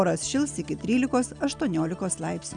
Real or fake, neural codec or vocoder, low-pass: real; none; 9.9 kHz